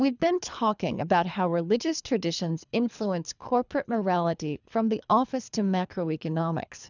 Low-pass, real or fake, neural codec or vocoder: 7.2 kHz; fake; codec, 24 kHz, 3 kbps, HILCodec